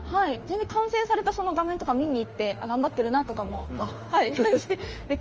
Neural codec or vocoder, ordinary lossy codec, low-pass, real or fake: autoencoder, 48 kHz, 32 numbers a frame, DAC-VAE, trained on Japanese speech; Opus, 24 kbps; 7.2 kHz; fake